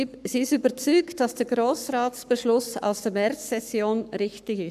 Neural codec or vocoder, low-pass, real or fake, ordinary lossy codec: codec, 44.1 kHz, 7.8 kbps, Pupu-Codec; 14.4 kHz; fake; none